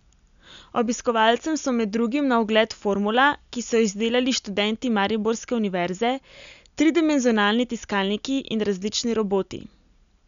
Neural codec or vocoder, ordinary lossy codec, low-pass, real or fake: none; none; 7.2 kHz; real